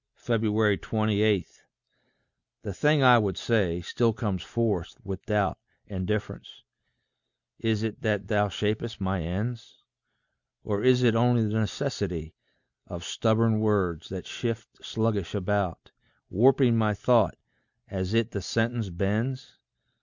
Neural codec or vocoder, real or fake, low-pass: none; real; 7.2 kHz